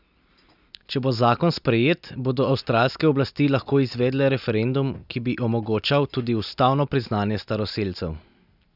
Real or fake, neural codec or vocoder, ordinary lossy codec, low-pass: real; none; none; 5.4 kHz